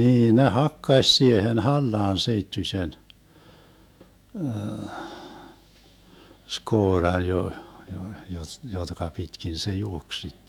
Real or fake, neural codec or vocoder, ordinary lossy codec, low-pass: fake; autoencoder, 48 kHz, 128 numbers a frame, DAC-VAE, trained on Japanese speech; none; 19.8 kHz